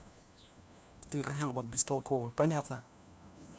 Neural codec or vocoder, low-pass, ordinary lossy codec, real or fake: codec, 16 kHz, 1 kbps, FunCodec, trained on LibriTTS, 50 frames a second; none; none; fake